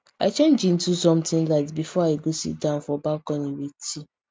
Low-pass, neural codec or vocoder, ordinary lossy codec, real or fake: none; none; none; real